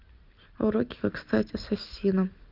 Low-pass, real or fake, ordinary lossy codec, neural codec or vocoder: 5.4 kHz; real; Opus, 32 kbps; none